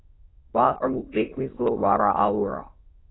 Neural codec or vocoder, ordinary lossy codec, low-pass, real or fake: autoencoder, 22.05 kHz, a latent of 192 numbers a frame, VITS, trained on many speakers; AAC, 16 kbps; 7.2 kHz; fake